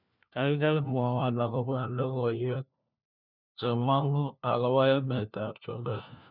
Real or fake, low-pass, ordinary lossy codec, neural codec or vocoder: fake; 5.4 kHz; none; codec, 16 kHz, 1 kbps, FunCodec, trained on LibriTTS, 50 frames a second